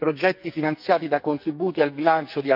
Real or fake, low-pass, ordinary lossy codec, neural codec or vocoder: fake; 5.4 kHz; none; codec, 44.1 kHz, 2.6 kbps, SNAC